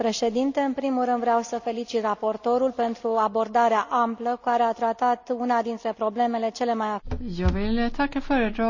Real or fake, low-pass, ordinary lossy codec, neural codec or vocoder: real; 7.2 kHz; none; none